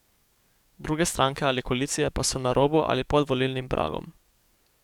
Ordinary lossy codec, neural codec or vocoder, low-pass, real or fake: none; codec, 44.1 kHz, 7.8 kbps, DAC; 19.8 kHz; fake